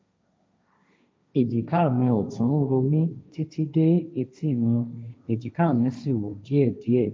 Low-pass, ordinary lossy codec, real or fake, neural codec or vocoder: none; none; fake; codec, 16 kHz, 1.1 kbps, Voila-Tokenizer